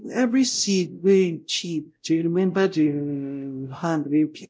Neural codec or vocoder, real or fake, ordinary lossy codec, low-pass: codec, 16 kHz, 0.5 kbps, X-Codec, WavLM features, trained on Multilingual LibriSpeech; fake; none; none